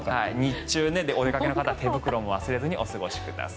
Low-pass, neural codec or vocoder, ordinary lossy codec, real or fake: none; none; none; real